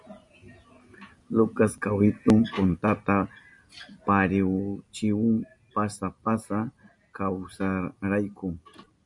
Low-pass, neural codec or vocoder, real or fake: 10.8 kHz; none; real